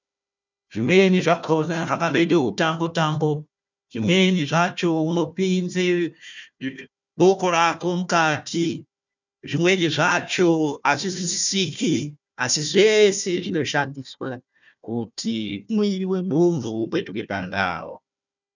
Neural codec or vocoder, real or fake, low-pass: codec, 16 kHz, 1 kbps, FunCodec, trained on Chinese and English, 50 frames a second; fake; 7.2 kHz